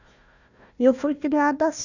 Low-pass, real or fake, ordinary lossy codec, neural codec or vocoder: 7.2 kHz; fake; none; codec, 16 kHz, 1 kbps, FunCodec, trained on Chinese and English, 50 frames a second